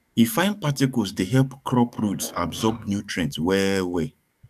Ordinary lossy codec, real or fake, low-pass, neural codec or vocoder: none; fake; 14.4 kHz; codec, 44.1 kHz, 7.8 kbps, DAC